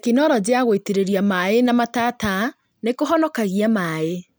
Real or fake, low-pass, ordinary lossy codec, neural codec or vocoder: real; none; none; none